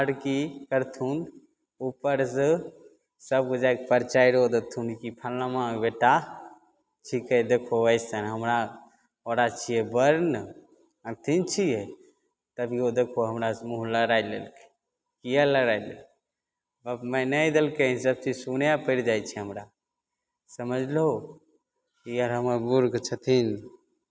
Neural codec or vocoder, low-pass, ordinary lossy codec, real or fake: none; none; none; real